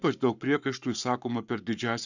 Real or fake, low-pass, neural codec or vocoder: fake; 7.2 kHz; codec, 44.1 kHz, 7.8 kbps, Pupu-Codec